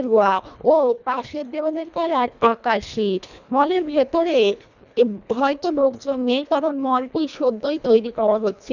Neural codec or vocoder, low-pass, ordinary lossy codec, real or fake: codec, 24 kHz, 1.5 kbps, HILCodec; 7.2 kHz; none; fake